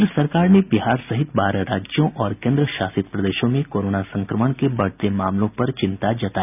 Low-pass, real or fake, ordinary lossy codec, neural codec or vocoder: 3.6 kHz; real; none; none